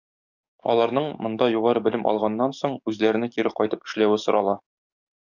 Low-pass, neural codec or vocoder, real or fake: 7.2 kHz; codec, 44.1 kHz, 7.8 kbps, DAC; fake